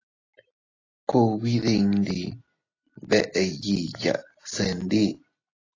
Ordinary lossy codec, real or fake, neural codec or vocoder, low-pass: AAC, 32 kbps; real; none; 7.2 kHz